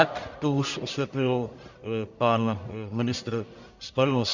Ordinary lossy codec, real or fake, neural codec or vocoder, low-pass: Opus, 64 kbps; fake; codec, 44.1 kHz, 1.7 kbps, Pupu-Codec; 7.2 kHz